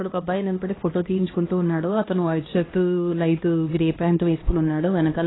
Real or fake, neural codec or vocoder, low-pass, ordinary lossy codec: fake; codec, 16 kHz, 1 kbps, X-Codec, HuBERT features, trained on LibriSpeech; 7.2 kHz; AAC, 16 kbps